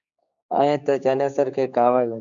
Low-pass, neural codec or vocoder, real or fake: 7.2 kHz; codec, 16 kHz, 4 kbps, X-Codec, HuBERT features, trained on general audio; fake